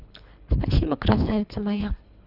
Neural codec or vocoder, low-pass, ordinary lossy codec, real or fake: codec, 24 kHz, 3 kbps, HILCodec; 5.4 kHz; none; fake